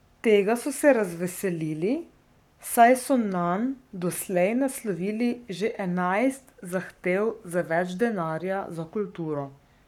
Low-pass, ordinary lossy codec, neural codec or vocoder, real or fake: 19.8 kHz; none; codec, 44.1 kHz, 7.8 kbps, Pupu-Codec; fake